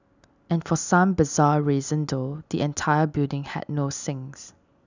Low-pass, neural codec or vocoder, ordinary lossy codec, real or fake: 7.2 kHz; none; none; real